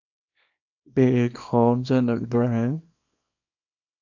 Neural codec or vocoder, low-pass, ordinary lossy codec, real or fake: codec, 24 kHz, 0.9 kbps, WavTokenizer, small release; 7.2 kHz; AAC, 48 kbps; fake